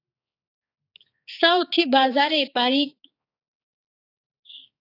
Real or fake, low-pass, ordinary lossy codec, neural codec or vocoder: fake; 5.4 kHz; AAC, 32 kbps; codec, 16 kHz, 4 kbps, X-Codec, HuBERT features, trained on balanced general audio